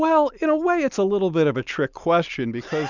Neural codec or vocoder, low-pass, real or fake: none; 7.2 kHz; real